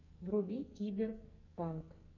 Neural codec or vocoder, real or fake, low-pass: codec, 44.1 kHz, 2.6 kbps, SNAC; fake; 7.2 kHz